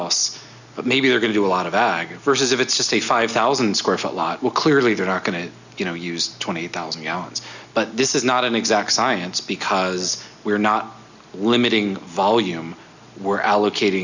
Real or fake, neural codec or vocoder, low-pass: real; none; 7.2 kHz